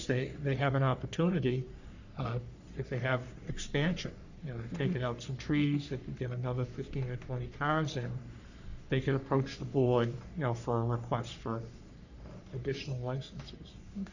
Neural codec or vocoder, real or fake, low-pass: codec, 44.1 kHz, 3.4 kbps, Pupu-Codec; fake; 7.2 kHz